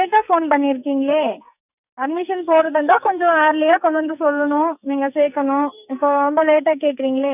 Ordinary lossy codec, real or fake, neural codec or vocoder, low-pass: none; fake; codec, 44.1 kHz, 2.6 kbps, SNAC; 3.6 kHz